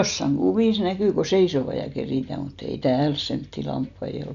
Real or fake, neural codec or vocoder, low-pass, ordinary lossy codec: real; none; 7.2 kHz; none